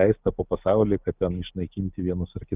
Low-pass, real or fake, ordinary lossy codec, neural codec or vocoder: 3.6 kHz; real; Opus, 24 kbps; none